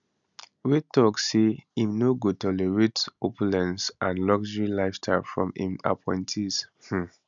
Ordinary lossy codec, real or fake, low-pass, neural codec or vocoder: none; real; 7.2 kHz; none